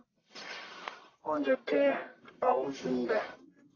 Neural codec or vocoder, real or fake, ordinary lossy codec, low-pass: codec, 44.1 kHz, 1.7 kbps, Pupu-Codec; fake; AAC, 32 kbps; 7.2 kHz